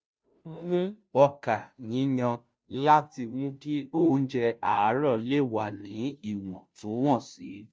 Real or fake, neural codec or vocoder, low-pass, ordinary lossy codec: fake; codec, 16 kHz, 0.5 kbps, FunCodec, trained on Chinese and English, 25 frames a second; none; none